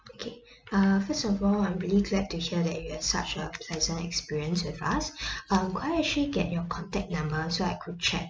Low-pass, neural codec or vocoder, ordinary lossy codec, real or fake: none; none; none; real